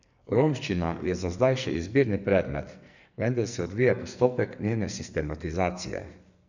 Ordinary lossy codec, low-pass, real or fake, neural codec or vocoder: none; 7.2 kHz; fake; codec, 44.1 kHz, 2.6 kbps, SNAC